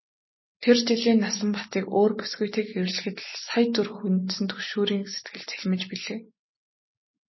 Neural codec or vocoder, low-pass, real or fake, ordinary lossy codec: none; 7.2 kHz; real; MP3, 24 kbps